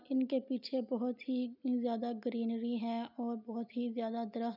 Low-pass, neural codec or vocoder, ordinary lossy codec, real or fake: 5.4 kHz; none; none; real